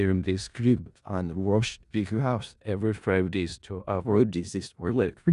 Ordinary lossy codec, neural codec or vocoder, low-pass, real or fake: none; codec, 16 kHz in and 24 kHz out, 0.4 kbps, LongCat-Audio-Codec, four codebook decoder; 10.8 kHz; fake